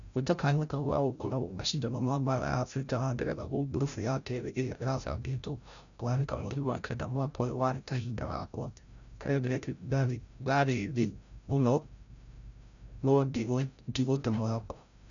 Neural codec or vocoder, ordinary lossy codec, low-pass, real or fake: codec, 16 kHz, 0.5 kbps, FreqCodec, larger model; none; 7.2 kHz; fake